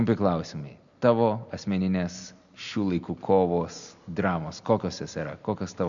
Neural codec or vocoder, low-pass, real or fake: none; 7.2 kHz; real